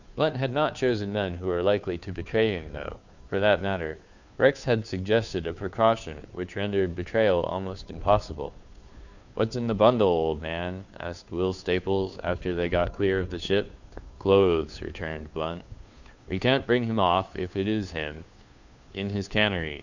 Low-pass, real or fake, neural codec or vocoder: 7.2 kHz; fake; codec, 16 kHz, 2 kbps, FunCodec, trained on Chinese and English, 25 frames a second